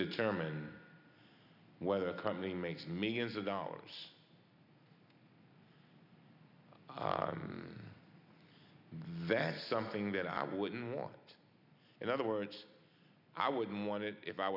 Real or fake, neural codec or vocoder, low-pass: real; none; 5.4 kHz